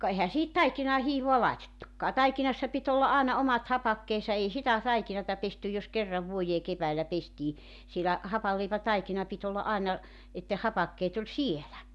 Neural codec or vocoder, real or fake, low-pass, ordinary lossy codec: none; real; none; none